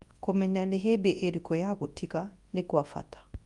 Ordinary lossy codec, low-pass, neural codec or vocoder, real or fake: Opus, 32 kbps; 10.8 kHz; codec, 24 kHz, 0.9 kbps, WavTokenizer, large speech release; fake